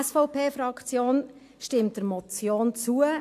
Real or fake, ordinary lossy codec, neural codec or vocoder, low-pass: real; AAC, 64 kbps; none; 14.4 kHz